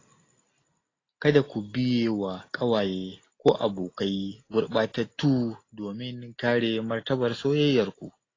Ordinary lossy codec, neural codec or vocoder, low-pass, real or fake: AAC, 32 kbps; none; 7.2 kHz; real